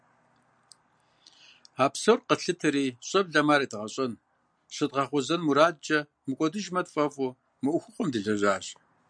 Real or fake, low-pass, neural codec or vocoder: real; 9.9 kHz; none